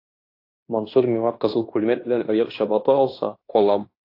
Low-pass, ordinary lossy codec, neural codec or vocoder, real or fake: 5.4 kHz; AAC, 32 kbps; codec, 16 kHz in and 24 kHz out, 0.9 kbps, LongCat-Audio-Codec, fine tuned four codebook decoder; fake